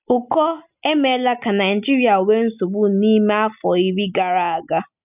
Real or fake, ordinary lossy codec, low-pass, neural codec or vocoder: real; none; 3.6 kHz; none